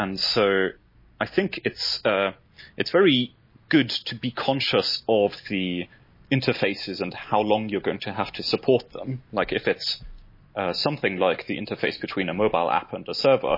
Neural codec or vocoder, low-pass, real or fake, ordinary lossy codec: none; 5.4 kHz; real; MP3, 24 kbps